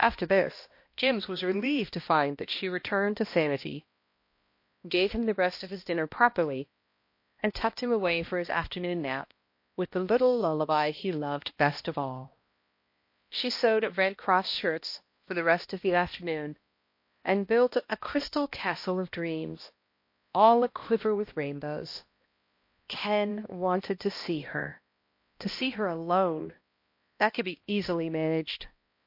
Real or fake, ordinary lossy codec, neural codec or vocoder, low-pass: fake; MP3, 32 kbps; codec, 16 kHz, 1 kbps, X-Codec, HuBERT features, trained on balanced general audio; 5.4 kHz